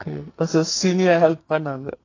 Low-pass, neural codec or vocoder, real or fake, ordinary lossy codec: 7.2 kHz; codec, 44.1 kHz, 2.6 kbps, SNAC; fake; AAC, 32 kbps